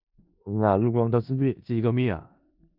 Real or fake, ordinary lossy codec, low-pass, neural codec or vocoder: fake; none; 5.4 kHz; codec, 16 kHz in and 24 kHz out, 0.4 kbps, LongCat-Audio-Codec, four codebook decoder